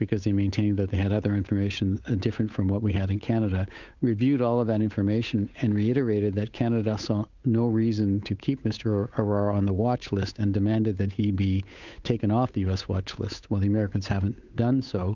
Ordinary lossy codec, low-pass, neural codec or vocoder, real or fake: Opus, 64 kbps; 7.2 kHz; codec, 16 kHz, 8 kbps, FunCodec, trained on Chinese and English, 25 frames a second; fake